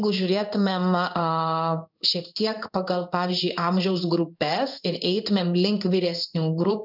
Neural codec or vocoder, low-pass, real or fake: codec, 16 kHz in and 24 kHz out, 1 kbps, XY-Tokenizer; 5.4 kHz; fake